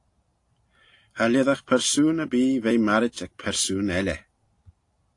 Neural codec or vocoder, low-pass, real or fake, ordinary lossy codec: none; 10.8 kHz; real; AAC, 48 kbps